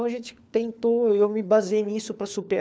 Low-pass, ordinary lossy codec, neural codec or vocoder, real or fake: none; none; codec, 16 kHz, 4 kbps, FunCodec, trained on LibriTTS, 50 frames a second; fake